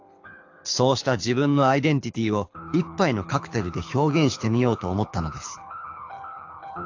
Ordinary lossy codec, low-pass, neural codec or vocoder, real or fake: AAC, 48 kbps; 7.2 kHz; codec, 24 kHz, 6 kbps, HILCodec; fake